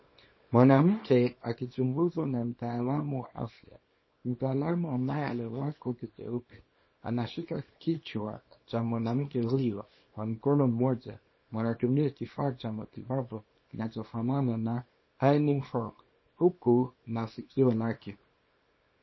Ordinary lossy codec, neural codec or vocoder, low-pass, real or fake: MP3, 24 kbps; codec, 24 kHz, 0.9 kbps, WavTokenizer, small release; 7.2 kHz; fake